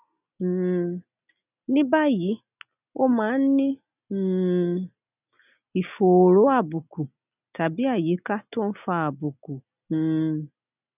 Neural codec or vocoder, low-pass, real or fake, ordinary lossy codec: none; 3.6 kHz; real; none